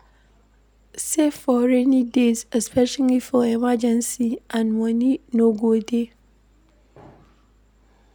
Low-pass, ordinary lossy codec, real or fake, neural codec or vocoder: none; none; real; none